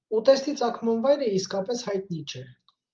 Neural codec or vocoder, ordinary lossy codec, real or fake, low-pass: none; Opus, 32 kbps; real; 7.2 kHz